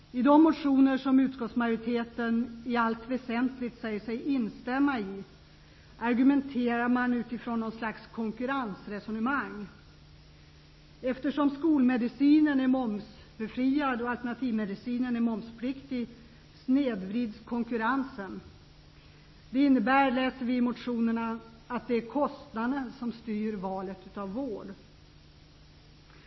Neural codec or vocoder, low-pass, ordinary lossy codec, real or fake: none; 7.2 kHz; MP3, 24 kbps; real